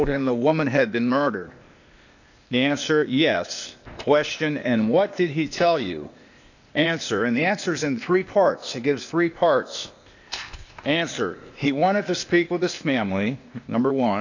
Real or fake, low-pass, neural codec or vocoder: fake; 7.2 kHz; codec, 16 kHz, 0.8 kbps, ZipCodec